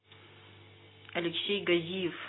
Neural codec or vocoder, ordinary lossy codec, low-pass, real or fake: none; AAC, 16 kbps; 7.2 kHz; real